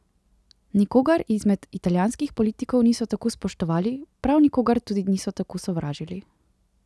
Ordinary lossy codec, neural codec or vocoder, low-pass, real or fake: none; none; none; real